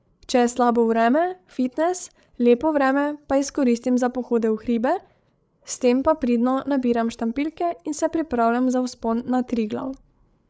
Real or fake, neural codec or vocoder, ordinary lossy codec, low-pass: fake; codec, 16 kHz, 8 kbps, FreqCodec, larger model; none; none